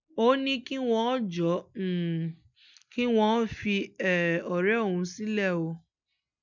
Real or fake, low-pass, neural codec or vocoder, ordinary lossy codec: real; 7.2 kHz; none; none